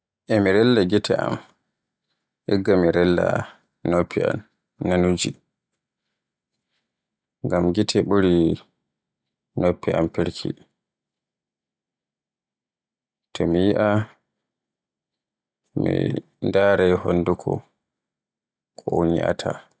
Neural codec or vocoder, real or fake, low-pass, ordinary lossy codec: none; real; none; none